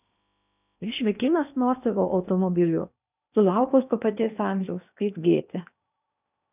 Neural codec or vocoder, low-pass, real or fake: codec, 16 kHz in and 24 kHz out, 0.8 kbps, FocalCodec, streaming, 65536 codes; 3.6 kHz; fake